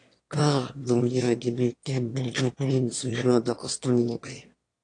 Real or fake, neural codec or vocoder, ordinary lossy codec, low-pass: fake; autoencoder, 22.05 kHz, a latent of 192 numbers a frame, VITS, trained on one speaker; AAC, 48 kbps; 9.9 kHz